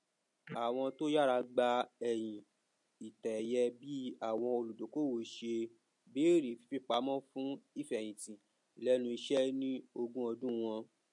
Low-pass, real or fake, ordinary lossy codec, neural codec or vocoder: 10.8 kHz; real; MP3, 48 kbps; none